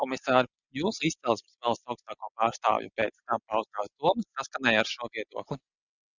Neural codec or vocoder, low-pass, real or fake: none; 7.2 kHz; real